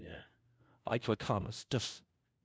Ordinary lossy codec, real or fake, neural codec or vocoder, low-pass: none; fake; codec, 16 kHz, 0.5 kbps, FunCodec, trained on LibriTTS, 25 frames a second; none